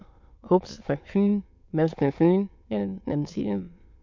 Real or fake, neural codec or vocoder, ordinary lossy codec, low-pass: fake; autoencoder, 22.05 kHz, a latent of 192 numbers a frame, VITS, trained on many speakers; MP3, 48 kbps; 7.2 kHz